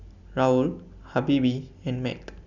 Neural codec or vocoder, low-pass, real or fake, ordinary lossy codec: none; 7.2 kHz; real; none